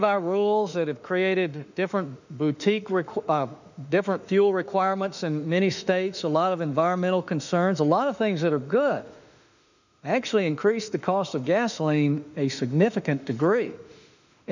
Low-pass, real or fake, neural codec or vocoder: 7.2 kHz; fake; autoencoder, 48 kHz, 32 numbers a frame, DAC-VAE, trained on Japanese speech